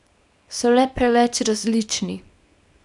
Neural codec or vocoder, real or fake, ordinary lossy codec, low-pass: codec, 24 kHz, 0.9 kbps, WavTokenizer, small release; fake; none; 10.8 kHz